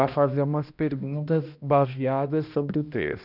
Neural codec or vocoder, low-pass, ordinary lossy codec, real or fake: codec, 16 kHz, 1 kbps, X-Codec, HuBERT features, trained on balanced general audio; 5.4 kHz; none; fake